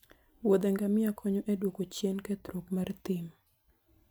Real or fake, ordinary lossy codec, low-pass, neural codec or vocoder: real; none; none; none